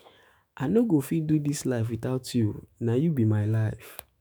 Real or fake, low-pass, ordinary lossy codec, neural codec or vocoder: fake; none; none; autoencoder, 48 kHz, 128 numbers a frame, DAC-VAE, trained on Japanese speech